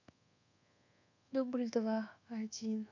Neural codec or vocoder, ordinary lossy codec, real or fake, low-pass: codec, 24 kHz, 1.2 kbps, DualCodec; none; fake; 7.2 kHz